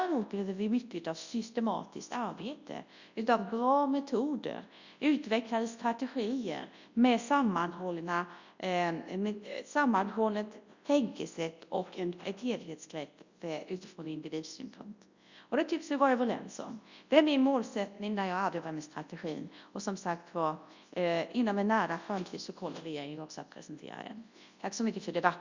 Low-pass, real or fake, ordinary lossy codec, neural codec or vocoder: 7.2 kHz; fake; Opus, 64 kbps; codec, 24 kHz, 0.9 kbps, WavTokenizer, large speech release